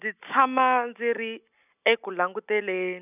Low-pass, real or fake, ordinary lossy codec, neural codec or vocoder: 3.6 kHz; real; none; none